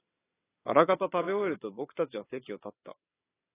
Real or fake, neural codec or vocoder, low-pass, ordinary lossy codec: fake; vocoder, 22.05 kHz, 80 mel bands, WaveNeXt; 3.6 kHz; AAC, 16 kbps